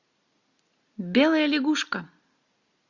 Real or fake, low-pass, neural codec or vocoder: real; 7.2 kHz; none